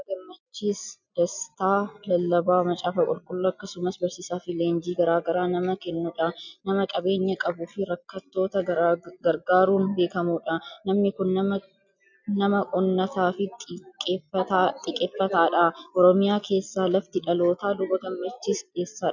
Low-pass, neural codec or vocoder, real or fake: 7.2 kHz; none; real